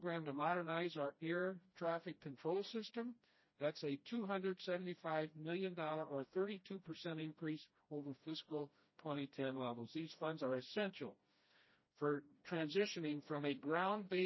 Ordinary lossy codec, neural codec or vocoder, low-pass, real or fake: MP3, 24 kbps; codec, 16 kHz, 1 kbps, FreqCodec, smaller model; 7.2 kHz; fake